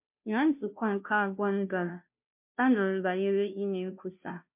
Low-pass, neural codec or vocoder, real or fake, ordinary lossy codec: 3.6 kHz; codec, 16 kHz, 0.5 kbps, FunCodec, trained on Chinese and English, 25 frames a second; fake; MP3, 32 kbps